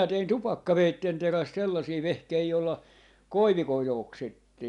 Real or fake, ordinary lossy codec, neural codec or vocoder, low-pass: real; none; none; 10.8 kHz